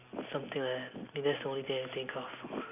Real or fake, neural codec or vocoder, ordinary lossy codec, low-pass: real; none; none; 3.6 kHz